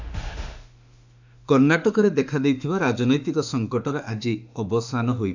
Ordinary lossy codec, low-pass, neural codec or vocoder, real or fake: none; 7.2 kHz; autoencoder, 48 kHz, 32 numbers a frame, DAC-VAE, trained on Japanese speech; fake